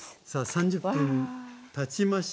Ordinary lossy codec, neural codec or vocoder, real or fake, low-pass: none; none; real; none